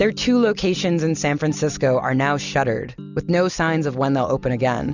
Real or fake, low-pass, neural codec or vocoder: real; 7.2 kHz; none